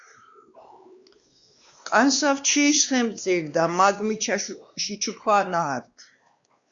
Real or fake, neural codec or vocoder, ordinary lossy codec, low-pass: fake; codec, 16 kHz, 2 kbps, X-Codec, WavLM features, trained on Multilingual LibriSpeech; Opus, 64 kbps; 7.2 kHz